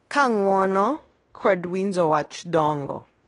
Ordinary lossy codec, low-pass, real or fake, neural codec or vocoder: AAC, 32 kbps; 10.8 kHz; fake; codec, 16 kHz in and 24 kHz out, 0.9 kbps, LongCat-Audio-Codec, fine tuned four codebook decoder